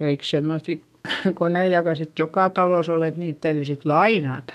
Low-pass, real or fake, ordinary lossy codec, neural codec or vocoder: 14.4 kHz; fake; none; codec, 32 kHz, 1.9 kbps, SNAC